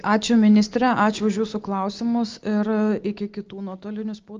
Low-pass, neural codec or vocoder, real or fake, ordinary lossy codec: 7.2 kHz; none; real; Opus, 24 kbps